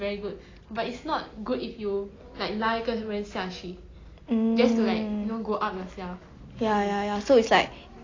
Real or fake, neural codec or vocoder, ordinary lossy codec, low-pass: real; none; AAC, 32 kbps; 7.2 kHz